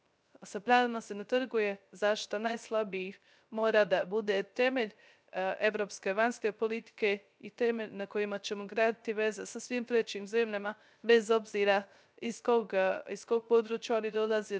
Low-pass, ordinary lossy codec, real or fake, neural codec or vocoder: none; none; fake; codec, 16 kHz, 0.3 kbps, FocalCodec